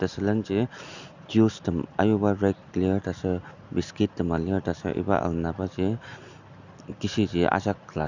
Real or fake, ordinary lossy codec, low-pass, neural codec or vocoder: real; none; 7.2 kHz; none